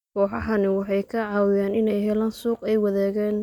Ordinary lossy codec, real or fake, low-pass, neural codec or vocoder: none; real; 19.8 kHz; none